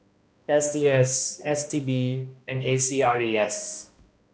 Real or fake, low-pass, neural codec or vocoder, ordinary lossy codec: fake; none; codec, 16 kHz, 1 kbps, X-Codec, HuBERT features, trained on balanced general audio; none